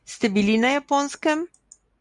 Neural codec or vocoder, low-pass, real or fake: none; 10.8 kHz; real